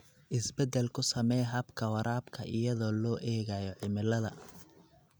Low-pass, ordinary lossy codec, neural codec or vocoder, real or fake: none; none; none; real